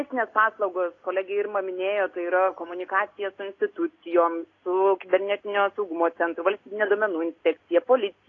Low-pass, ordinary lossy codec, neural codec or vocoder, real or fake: 7.2 kHz; AAC, 32 kbps; none; real